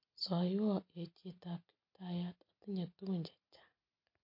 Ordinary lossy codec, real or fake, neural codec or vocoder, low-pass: MP3, 32 kbps; real; none; 5.4 kHz